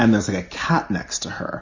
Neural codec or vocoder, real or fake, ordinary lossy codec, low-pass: none; real; MP3, 32 kbps; 7.2 kHz